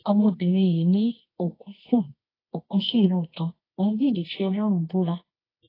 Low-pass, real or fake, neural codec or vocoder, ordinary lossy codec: 5.4 kHz; fake; codec, 24 kHz, 0.9 kbps, WavTokenizer, medium music audio release; AAC, 24 kbps